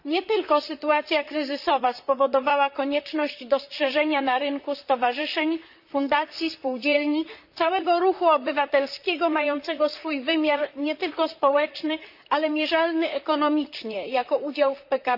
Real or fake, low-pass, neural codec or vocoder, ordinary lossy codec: fake; 5.4 kHz; vocoder, 44.1 kHz, 128 mel bands, Pupu-Vocoder; none